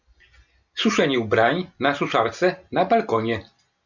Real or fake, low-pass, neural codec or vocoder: real; 7.2 kHz; none